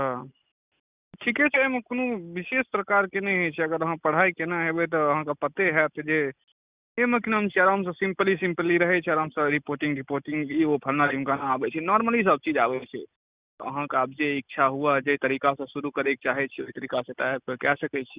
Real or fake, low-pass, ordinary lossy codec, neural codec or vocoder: real; 3.6 kHz; Opus, 64 kbps; none